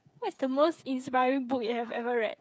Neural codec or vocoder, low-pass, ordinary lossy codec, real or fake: codec, 16 kHz, 8 kbps, FreqCodec, smaller model; none; none; fake